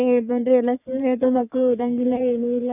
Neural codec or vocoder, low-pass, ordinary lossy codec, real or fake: codec, 44.1 kHz, 3.4 kbps, Pupu-Codec; 3.6 kHz; none; fake